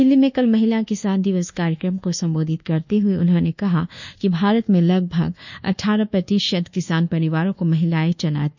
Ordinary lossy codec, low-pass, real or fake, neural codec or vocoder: none; 7.2 kHz; fake; codec, 24 kHz, 1.2 kbps, DualCodec